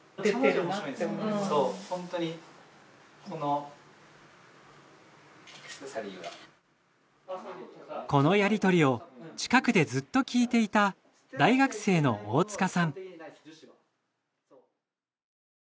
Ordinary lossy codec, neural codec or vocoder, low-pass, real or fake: none; none; none; real